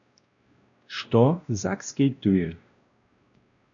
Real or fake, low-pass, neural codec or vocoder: fake; 7.2 kHz; codec, 16 kHz, 1 kbps, X-Codec, WavLM features, trained on Multilingual LibriSpeech